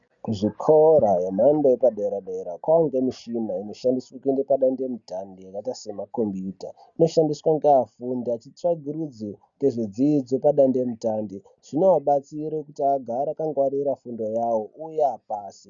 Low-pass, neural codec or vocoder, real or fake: 7.2 kHz; none; real